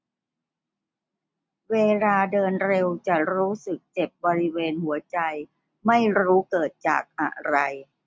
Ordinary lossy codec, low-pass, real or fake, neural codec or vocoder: none; none; real; none